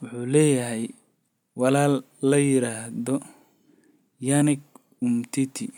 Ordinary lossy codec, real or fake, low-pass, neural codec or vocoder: none; real; 19.8 kHz; none